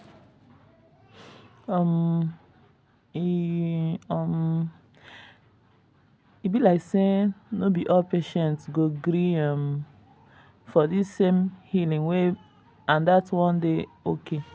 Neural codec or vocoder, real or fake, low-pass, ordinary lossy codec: none; real; none; none